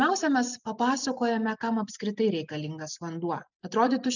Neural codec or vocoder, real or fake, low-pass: none; real; 7.2 kHz